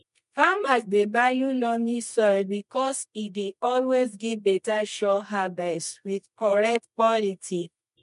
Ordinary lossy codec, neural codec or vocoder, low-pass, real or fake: MP3, 64 kbps; codec, 24 kHz, 0.9 kbps, WavTokenizer, medium music audio release; 10.8 kHz; fake